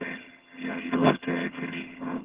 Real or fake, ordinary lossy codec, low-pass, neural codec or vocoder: fake; Opus, 16 kbps; 3.6 kHz; vocoder, 22.05 kHz, 80 mel bands, HiFi-GAN